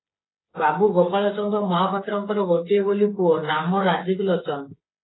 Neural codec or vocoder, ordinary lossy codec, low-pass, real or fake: codec, 16 kHz, 8 kbps, FreqCodec, smaller model; AAC, 16 kbps; 7.2 kHz; fake